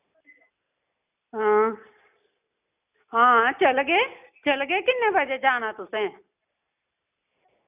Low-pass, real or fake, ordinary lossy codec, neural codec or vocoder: 3.6 kHz; real; none; none